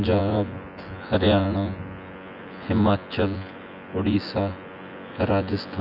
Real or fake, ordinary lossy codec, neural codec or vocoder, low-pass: fake; none; vocoder, 24 kHz, 100 mel bands, Vocos; 5.4 kHz